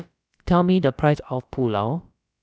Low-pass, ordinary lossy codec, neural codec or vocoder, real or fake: none; none; codec, 16 kHz, about 1 kbps, DyCAST, with the encoder's durations; fake